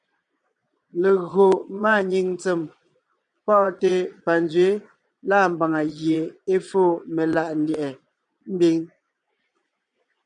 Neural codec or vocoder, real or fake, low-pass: vocoder, 22.05 kHz, 80 mel bands, Vocos; fake; 9.9 kHz